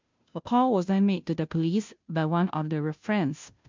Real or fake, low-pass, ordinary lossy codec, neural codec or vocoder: fake; 7.2 kHz; MP3, 64 kbps; codec, 16 kHz, 0.5 kbps, FunCodec, trained on Chinese and English, 25 frames a second